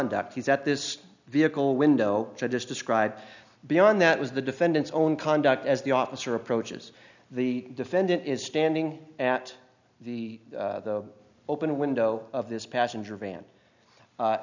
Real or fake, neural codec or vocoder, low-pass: real; none; 7.2 kHz